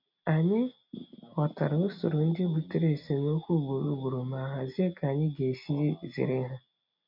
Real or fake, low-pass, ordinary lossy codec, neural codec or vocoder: real; 5.4 kHz; none; none